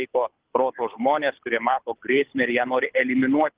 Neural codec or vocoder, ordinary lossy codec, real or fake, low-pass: codec, 24 kHz, 6 kbps, HILCodec; Opus, 16 kbps; fake; 3.6 kHz